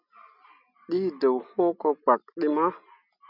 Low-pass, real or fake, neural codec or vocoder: 5.4 kHz; real; none